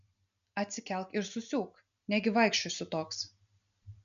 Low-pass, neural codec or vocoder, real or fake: 7.2 kHz; none; real